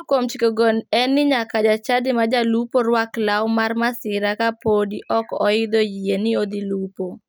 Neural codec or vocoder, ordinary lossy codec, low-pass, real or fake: none; none; none; real